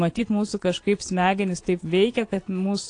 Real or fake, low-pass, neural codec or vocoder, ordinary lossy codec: fake; 9.9 kHz; vocoder, 22.05 kHz, 80 mel bands, Vocos; AAC, 48 kbps